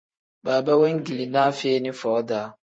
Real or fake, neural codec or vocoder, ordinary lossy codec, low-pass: fake; vocoder, 44.1 kHz, 128 mel bands every 512 samples, BigVGAN v2; MP3, 32 kbps; 9.9 kHz